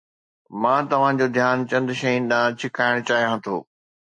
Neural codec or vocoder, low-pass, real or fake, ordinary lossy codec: none; 10.8 kHz; real; MP3, 48 kbps